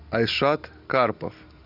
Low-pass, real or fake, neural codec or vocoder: 5.4 kHz; real; none